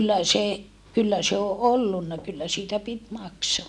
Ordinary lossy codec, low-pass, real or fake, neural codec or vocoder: none; none; real; none